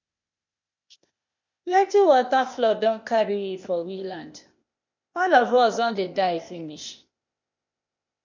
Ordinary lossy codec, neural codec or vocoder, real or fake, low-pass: MP3, 48 kbps; codec, 16 kHz, 0.8 kbps, ZipCodec; fake; 7.2 kHz